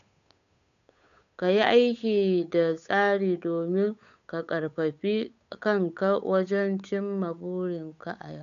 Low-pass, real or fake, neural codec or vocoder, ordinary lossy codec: 7.2 kHz; fake; codec, 16 kHz, 8 kbps, FunCodec, trained on Chinese and English, 25 frames a second; none